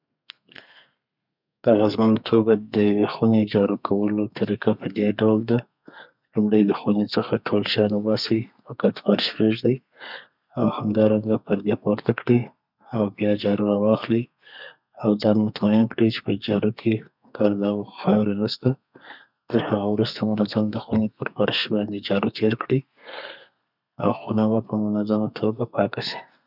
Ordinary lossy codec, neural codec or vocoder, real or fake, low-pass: none; codec, 32 kHz, 1.9 kbps, SNAC; fake; 5.4 kHz